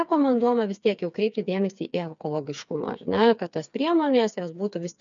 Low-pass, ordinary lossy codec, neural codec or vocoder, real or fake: 7.2 kHz; MP3, 96 kbps; codec, 16 kHz, 4 kbps, FreqCodec, smaller model; fake